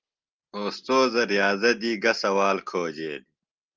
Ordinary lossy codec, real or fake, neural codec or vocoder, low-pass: Opus, 24 kbps; real; none; 7.2 kHz